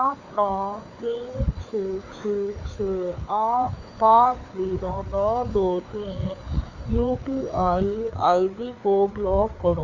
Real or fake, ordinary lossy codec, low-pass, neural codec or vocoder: fake; none; 7.2 kHz; codec, 16 kHz, 4 kbps, FreqCodec, larger model